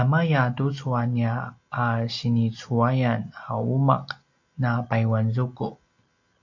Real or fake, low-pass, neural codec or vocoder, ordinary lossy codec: real; 7.2 kHz; none; AAC, 48 kbps